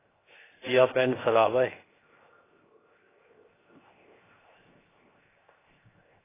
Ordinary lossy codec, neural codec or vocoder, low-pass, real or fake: AAC, 16 kbps; codec, 16 kHz, 0.7 kbps, FocalCodec; 3.6 kHz; fake